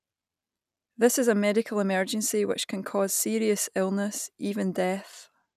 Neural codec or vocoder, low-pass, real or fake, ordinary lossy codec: none; 14.4 kHz; real; none